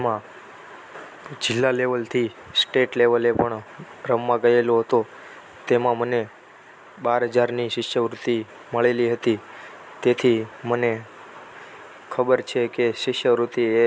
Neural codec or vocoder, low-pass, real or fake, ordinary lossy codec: none; none; real; none